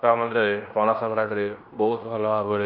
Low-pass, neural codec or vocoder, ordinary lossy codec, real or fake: 5.4 kHz; codec, 16 kHz in and 24 kHz out, 0.9 kbps, LongCat-Audio-Codec, fine tuned four codebook decoder; none; fake